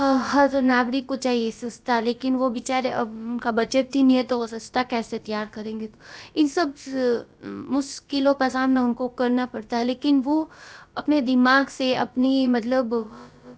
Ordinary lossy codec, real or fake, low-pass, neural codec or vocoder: none; fake; none; codec, 16 kHz, about 1 kbps, DyCAST, with the encoder's durations